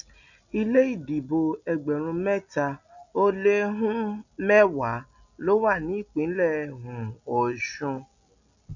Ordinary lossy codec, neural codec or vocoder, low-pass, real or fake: none; none; 7.2 kHz; real